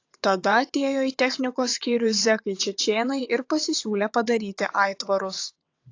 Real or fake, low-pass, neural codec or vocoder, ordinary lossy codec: fake; 7.2 kHz; vocoder, 22.05 kHz, 80 mel bands, WaveNeXt; AAC, 48 kbps